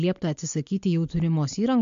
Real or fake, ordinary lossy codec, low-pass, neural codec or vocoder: real; MP3, 48 kbps; 7.2 kHz; none